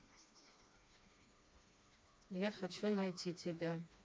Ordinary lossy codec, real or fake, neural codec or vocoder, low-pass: none; fake; codec, 16 kHz, 2 kbps, FreqCodec, smaller model; none